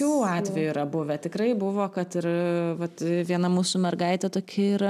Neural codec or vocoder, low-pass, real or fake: none; 14.4 kHz; real